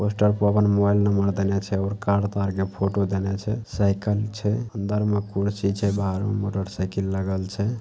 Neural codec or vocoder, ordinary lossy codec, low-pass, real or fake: none; none; none; real